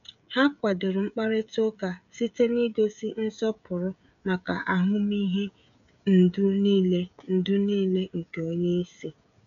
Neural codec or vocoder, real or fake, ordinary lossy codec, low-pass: codec, 16 kHz, 16 kbps, FreqCodec, smaller model; fake; MP3, 96 kbps; 7.2 kHz